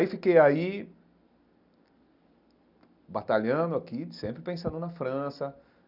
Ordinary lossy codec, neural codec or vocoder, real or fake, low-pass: none; none; real; 5.4 kHz